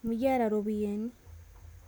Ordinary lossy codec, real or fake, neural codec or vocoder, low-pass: none; real; none; none